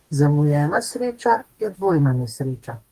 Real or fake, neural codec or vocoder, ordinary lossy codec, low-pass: fake; codec, 44.1 kHz, 2.6 kbps, DAC; Opus, 24 kbps; 14.4 kHz